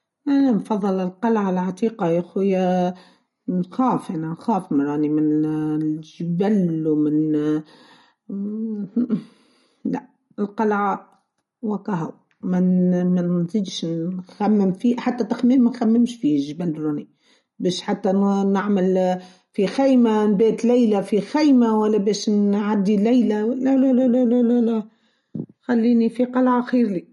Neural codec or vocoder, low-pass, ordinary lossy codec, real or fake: none; 19.8 kHz; MP3, 48 kbps; real